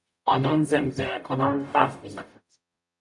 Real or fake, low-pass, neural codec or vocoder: fake; 10.8 kHz; codec, 44.1 kHz, 0.9 kbps, DAC